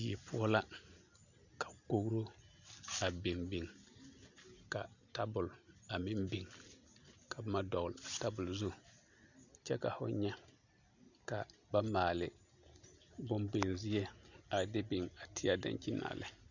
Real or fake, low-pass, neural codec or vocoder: real; 7.2 kHz; none